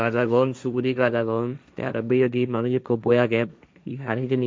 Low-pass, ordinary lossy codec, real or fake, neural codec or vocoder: none; none; fake; codec, 16 kHz, 1.1 kbps, Voila-Tokenizer